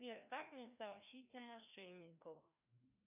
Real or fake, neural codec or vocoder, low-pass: fake; codec, 16 kHz, 1 kbps, FreqCodec, larger model; 3.6 kHz